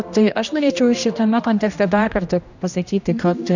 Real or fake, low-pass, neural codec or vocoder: fake; 7.2 kHz; codec, 16 kHz, 1 kbps, X-Codec, HuBERT features, trained on general audio